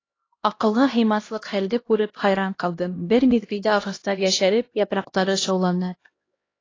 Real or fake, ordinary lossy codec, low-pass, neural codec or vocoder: fake; AAC, 32 kbps; 7.2 kHz; codec, 16 kHz, 1 kbps, X-Codec, HuBERT features, trained on LibriSpeech